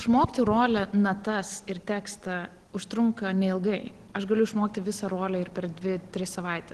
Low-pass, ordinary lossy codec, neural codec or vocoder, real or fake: 10.8 kHz; Opus, 16 kbps; none; real